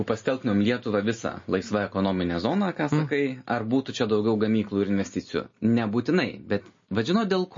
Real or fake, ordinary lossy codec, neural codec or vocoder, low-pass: real; MP3, 32 kbps; none; 7.2 kHz